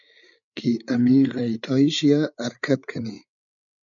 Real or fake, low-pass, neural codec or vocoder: fake; 7.2 kHz; codec, 16 kHz, 8 kbps, FreqCodec, larger model